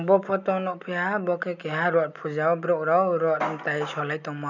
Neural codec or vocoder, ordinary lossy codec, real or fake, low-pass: none; none; real; 7.2 kHz